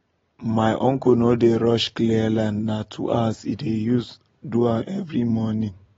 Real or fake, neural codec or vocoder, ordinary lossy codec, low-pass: real; none; AAC, 24 kbps; 7.2 kHz